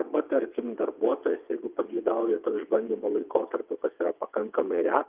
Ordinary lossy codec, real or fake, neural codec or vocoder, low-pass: Opus, 24 kbps; fake; vocoder, 22.05 kHz, 80 mel bands, WaveNeXt; 3.6 kHz